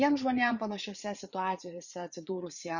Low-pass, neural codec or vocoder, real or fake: 7.2 kHz; vocoder, 44.1 kHz, 80 mel bands, Vocos; fake